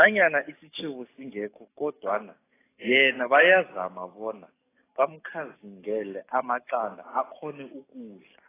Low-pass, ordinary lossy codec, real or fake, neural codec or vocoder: 3.6 kHz; AAC, 16 kbps; fake; codec, 44.1 kHz, 7.8 kbps, DAC